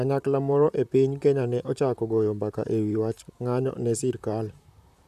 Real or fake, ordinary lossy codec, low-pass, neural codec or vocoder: fake; none; 14.4 kHz; vocoder, 44.1 kHz, 128 mel bands, Pupu-Vocoder